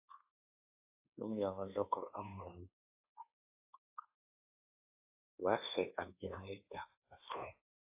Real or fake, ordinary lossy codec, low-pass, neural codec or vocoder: fake; AAC, 24 kbps; 3.6 kHz; codec, 24 kHz, 1.2 kbps, DualCodec